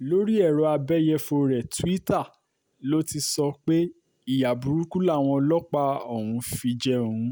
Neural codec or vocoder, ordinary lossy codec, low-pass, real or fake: none; none; none; real